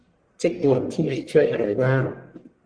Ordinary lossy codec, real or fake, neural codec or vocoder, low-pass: Opus, 24 kbps; fake; codec, 44.1 kHz, 1.7 kbps, Pupu-Codec; 9.9 kHz